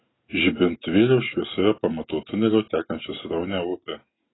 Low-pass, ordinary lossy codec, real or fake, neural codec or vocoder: 7.2 kHz; AAC, 16 kbps; real; none